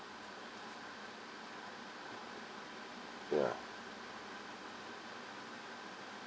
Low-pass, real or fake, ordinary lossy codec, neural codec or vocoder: none; real; none; none